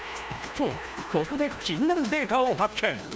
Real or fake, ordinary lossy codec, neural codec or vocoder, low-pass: fake; none; codec, 16 kHz, 1 kbps, FunCodec, trained on LibriTTS, 50 frames a second; none